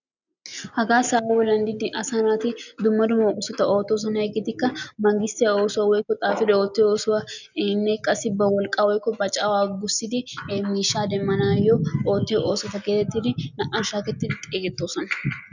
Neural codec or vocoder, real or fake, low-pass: none; real; 7.2 kHz